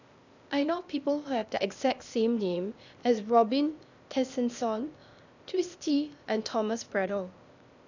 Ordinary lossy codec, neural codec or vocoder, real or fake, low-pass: none; codec, 16 kHz, 0.8 kbps, ZipCodec; fake; 7.2 kHz